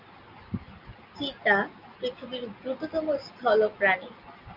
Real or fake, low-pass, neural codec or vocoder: real; 5.4 kHz; none